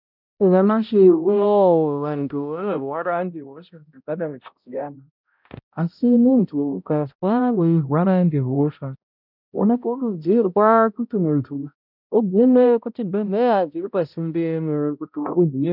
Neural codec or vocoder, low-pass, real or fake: codec, 16 kHz, 0.5 kbps, X-Codec, HuBERT features, trained on balanced general audio; 5.4 kHz; fake